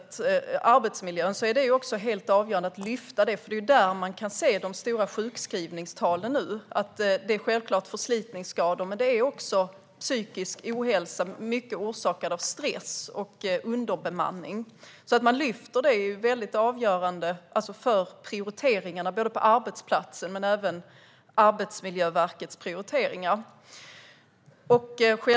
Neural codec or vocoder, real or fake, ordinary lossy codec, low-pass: none; real; none; none